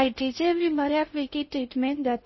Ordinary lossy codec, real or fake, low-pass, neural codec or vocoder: MP3, 24 kbps; fake; 7.2 kHz; codec, 16 kHz in and 24 kHz out, 0.6 kbps, FocalCodec, streaming, 2048 codes